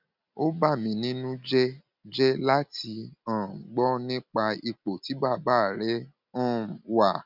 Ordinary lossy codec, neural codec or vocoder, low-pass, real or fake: none; none; 5.4 kHz; real